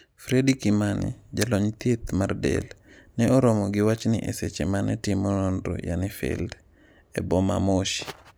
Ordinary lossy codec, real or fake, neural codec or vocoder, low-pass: none; real; none; none